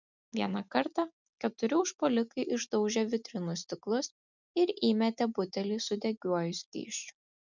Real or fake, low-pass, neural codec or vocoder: real; 7.2 kHz; none